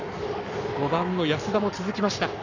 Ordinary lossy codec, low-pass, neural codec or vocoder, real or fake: none; 7.2 kHz; codec, 44.1 kHz, 7.8 kbps, Pupu-Codec; fake